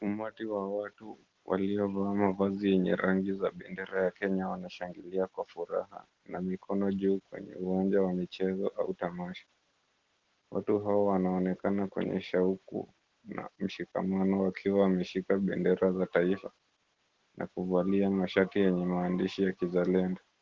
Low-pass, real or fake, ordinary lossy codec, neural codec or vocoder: 7.2 kHz; real; Opus, 16 kbps; none